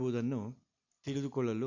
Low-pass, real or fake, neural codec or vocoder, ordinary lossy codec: 7.2 kHz; real; none; none